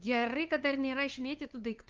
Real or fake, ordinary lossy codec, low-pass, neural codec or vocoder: fake; Opus, 32 kbps; 7.2 kHz; codec, 16 kHz, 2 kbps, FunCodec, trained on LibriTTS, 25 frames a second